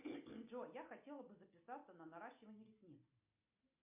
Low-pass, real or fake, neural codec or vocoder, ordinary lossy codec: 3.6 kHz; real; none; MP3, 32 kbps